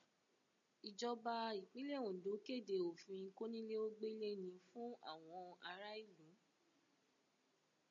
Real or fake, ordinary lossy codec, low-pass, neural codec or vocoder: real; MP3, 96 kbps; 7.2 kHz; none